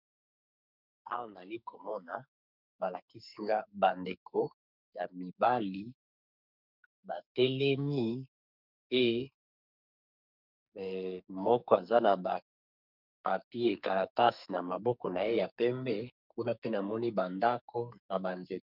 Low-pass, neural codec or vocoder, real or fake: 5.4 kHz; codec, 44.1 kHz, 2.6 kbps, SNAC; fake